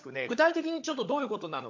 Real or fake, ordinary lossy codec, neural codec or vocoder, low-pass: fake; AAC, 48 kbps; codec, 16 kHz, 16 kbps, FunCodec, trained on LibriTTS, 50 frames a second; 7.2 kHz